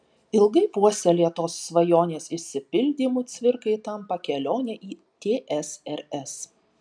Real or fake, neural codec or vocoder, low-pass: real; none; 9.9 kHz